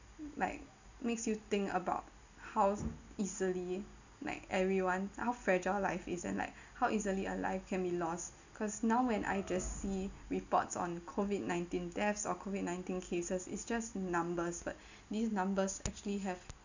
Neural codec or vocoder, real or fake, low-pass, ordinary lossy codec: none; real; 7.2 kHz; none